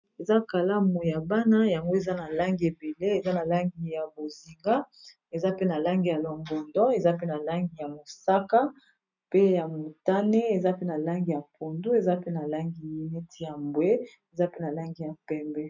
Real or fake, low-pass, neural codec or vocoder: real; 7.2 kHz; none